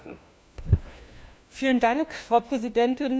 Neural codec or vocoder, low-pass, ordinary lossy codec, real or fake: codec, 16 kHz, 1 kbps, FunCodec, trained on LibriTTS, 50 frames a second; none; none; fake